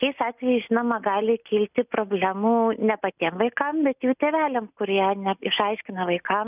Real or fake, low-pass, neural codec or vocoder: real; 3.6 kHz; none